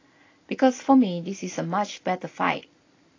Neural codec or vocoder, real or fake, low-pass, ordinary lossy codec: none; real; 7.2 kHz; AAC, 32 kbps